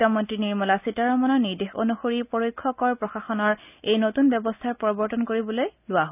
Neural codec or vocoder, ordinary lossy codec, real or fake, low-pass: none; none; real; 3.6 kHz